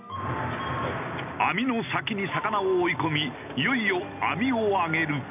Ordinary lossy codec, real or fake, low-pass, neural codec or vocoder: none; real; 3.6 kHz; none